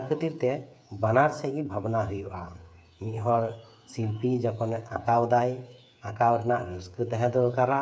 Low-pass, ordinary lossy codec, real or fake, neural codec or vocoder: none; none; fake; codec, 16 kHz, 8 kbps, FreqCodec, smaller model